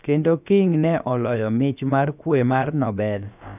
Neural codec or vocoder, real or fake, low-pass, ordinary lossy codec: codec, 16 kHz, about 1 kbps, DyCAST, with the encoder's durations; fake; 3.6 kHz; none